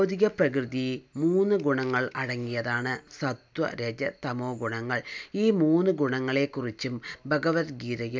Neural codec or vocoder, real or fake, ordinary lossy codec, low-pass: none; real; none; none